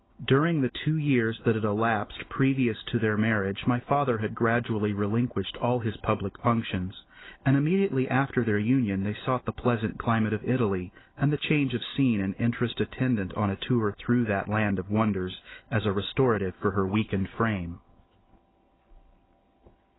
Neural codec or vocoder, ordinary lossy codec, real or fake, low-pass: none; AAC, 16 kbps; real; 7.2 kHz